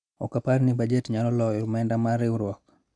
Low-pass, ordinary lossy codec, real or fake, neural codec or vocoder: 9.9 kHz; none; real; none